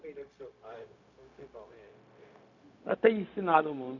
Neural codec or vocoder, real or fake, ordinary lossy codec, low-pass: codec, 16 kHz, 0.4 kbps, LongCat-Audio-Codec; fake; none; 7.2 kHz